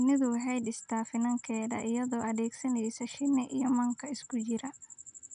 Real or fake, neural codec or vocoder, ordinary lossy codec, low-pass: real; none; none; 9.9 kHz